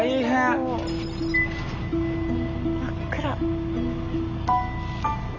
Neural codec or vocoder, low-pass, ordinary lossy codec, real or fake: none; 7.2 kHz; none; real